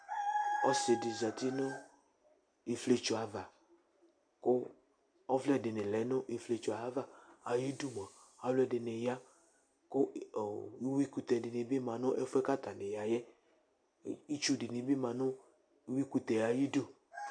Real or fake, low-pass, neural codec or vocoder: real; 9.9 kHz; none